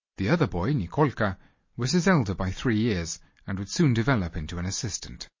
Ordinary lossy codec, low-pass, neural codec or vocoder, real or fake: MP3, 32 kbps; 7.2 kHz; none; real